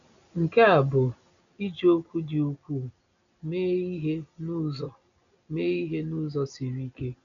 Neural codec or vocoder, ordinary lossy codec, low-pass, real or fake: none; MP3, 96 kbps; 7.2 kHz; real